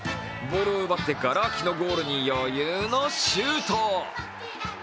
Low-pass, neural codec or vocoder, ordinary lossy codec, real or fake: none; none; none; real